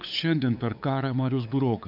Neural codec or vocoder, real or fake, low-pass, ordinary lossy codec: codec, 16 kHz, 8 kbps, FunCodec, trained on LibriTTS, 25 frames a second; fake; 5.4 kHz; AAC, 48 kbps